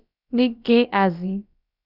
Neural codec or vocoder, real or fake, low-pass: codec, 16 kHz, about 1 kbps, DyCAST, with the encoder's durations; fake; 5.4 kHz